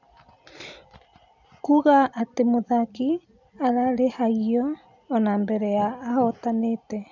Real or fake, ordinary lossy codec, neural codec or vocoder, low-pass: real; none; none; 7.2 kHz